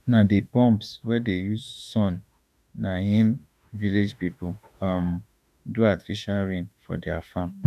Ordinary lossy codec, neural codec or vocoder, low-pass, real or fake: none; autoencoder, 48 kHz, 32 numbers a frame, DAC-VAE, trained on Japanese speech; 14.4 kHz; fake